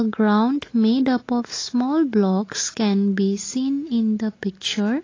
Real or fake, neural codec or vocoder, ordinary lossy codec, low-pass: real; none; AAC, 32 kbps; 7.2 kHz